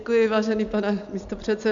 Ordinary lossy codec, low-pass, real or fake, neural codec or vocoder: MP3, 64 kbps; 7.2 kHz; real; none